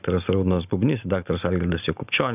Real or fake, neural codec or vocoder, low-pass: real; none; 3.6 kHz